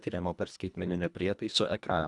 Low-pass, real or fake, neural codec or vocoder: 10.8 kHz; fake; codec, 24 kHz, 1.5 kbps, HILCodec